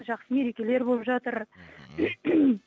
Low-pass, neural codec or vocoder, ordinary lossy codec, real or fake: none; none; none; real